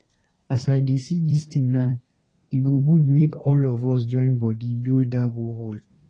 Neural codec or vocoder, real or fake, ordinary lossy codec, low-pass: codec, 24 kHz, 1 kbps, SNAC; fake; AAC, 32 kbps; 9.9 kHz